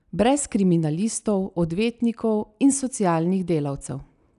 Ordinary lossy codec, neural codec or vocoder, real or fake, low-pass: none; none; real; 10.8 kHz